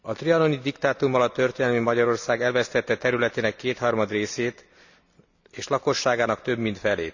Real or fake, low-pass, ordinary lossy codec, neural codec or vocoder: real; 7.2 kHz; none; none